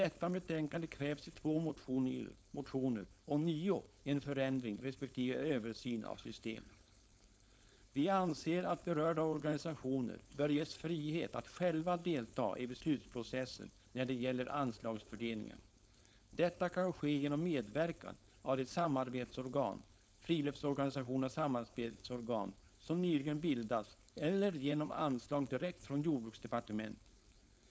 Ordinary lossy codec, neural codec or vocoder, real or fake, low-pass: none; codec, 16 kHz, 4.8 kbps, FACodec; fake; none